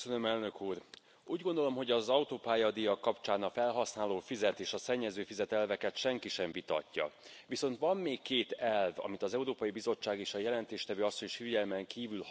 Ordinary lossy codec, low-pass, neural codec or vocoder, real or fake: none; none; none; real